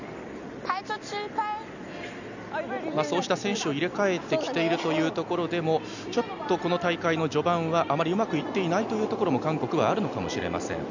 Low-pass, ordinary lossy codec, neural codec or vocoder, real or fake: 7.2 kHz; none; none; real